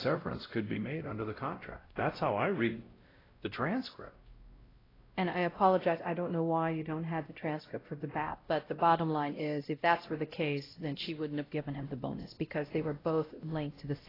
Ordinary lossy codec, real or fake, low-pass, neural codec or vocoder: AAC, 24 kbps; fake; 5.4 kHz; codec, 16 kHz, 0.5 kbps, X-Codec, WavLM features, trained on Multilingual LibriSpeech